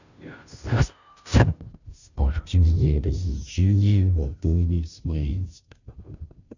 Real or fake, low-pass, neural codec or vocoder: fake; 7.2 kHz; codec, 16 kHz, 0.5 kbps, FunCodec, trained on Chinese and English, 25 frames a second